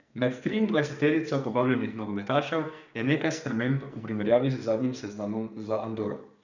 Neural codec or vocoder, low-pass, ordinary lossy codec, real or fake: codec, 44.1 kHz, 2.6 kbps, SNAC; 7.2 kHz; none; fake